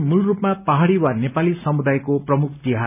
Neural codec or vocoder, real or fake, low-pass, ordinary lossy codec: none; real; 3.6 kHz; none